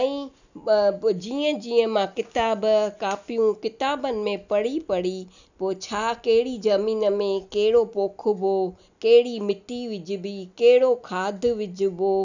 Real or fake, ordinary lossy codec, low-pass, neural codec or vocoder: real; none; 7.2 kHz; none